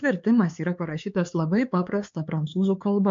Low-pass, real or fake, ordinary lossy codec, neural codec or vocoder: 7.2 kHz; fake; MP3, 48 kbps; codec, 16 kHz, 4 kbps, X-Codec, HuBERT features, trained on LibriSpeech